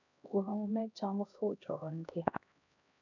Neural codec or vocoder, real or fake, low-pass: codec, 16 kHz, 1 kbps, X-Codec, HuBERT features, trained on LibriSpeech; fake; 7.2 kHz